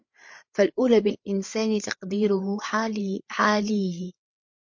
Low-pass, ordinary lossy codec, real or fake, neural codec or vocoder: 7.2 kHz; MP3, 48 kbps; fake; codec, 16 kHz, 8 kbps, FreqCodec, larger model